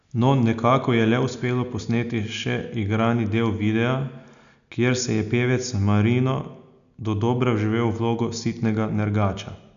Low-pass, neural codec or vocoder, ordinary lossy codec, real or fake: 7.2 kHz; none; none; real